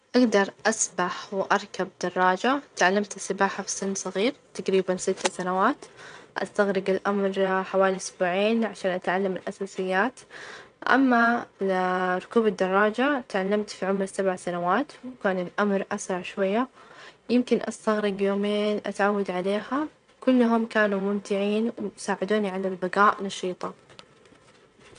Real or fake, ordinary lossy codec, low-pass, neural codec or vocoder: fake; none; 9.9 kHz; vocoder, 22.05 kHz, 80 mel bands, WaveNeXt